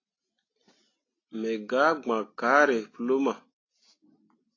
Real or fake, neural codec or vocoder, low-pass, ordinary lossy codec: real; none; 7.2 kHz; AAC, 32 kbps